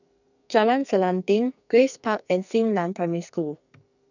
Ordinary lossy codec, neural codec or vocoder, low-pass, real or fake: none; codec, 44.1 kHz, 2.6 kbps, SNAC; 7.2 kHz; fake